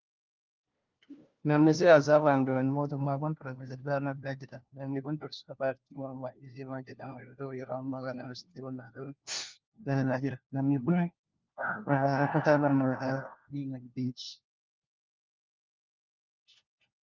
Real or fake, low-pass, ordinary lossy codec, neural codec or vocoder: fake; 7.2 kHz; Opus, 32 kbps; codec, 16 kHz, 1 kbps, FunCodec, trained on LibriTTS, 50 frames a second